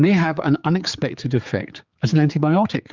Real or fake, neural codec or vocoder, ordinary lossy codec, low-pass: fake; codec, 16 kHz, 4 kbps, X-Codec, HuBERT features, trained on general audio; Opus, 32 kbps; 7.2 kHz